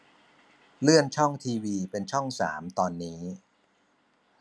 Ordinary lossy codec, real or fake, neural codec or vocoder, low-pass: none; real; none; none